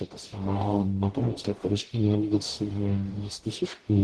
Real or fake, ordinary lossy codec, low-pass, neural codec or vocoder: fake; Opus, 16 kbps; 10.8 kHz; codec, 44.1 kHz, 0.9 kbps, DAC